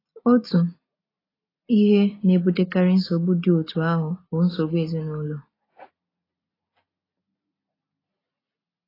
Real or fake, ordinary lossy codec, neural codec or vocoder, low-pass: real; AAC, 24 kbps; none; 5.4 kHz